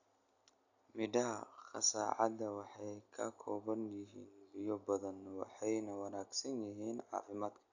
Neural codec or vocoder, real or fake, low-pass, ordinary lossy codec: none; real; 7.2 kHz; AAC, 48 kbps